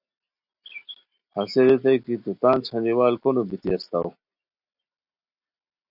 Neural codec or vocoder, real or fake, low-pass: none; real; 5.4 kHz